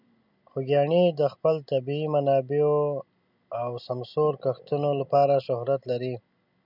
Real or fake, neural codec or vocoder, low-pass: real; none; 5.4 kHz